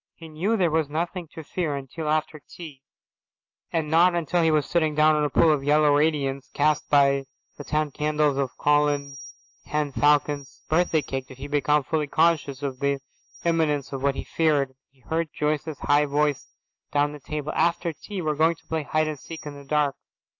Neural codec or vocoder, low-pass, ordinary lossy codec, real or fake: none; 7.2 kHz; AAC, 48 kbps; real